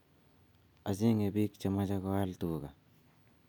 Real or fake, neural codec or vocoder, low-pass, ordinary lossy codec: real; none; none; none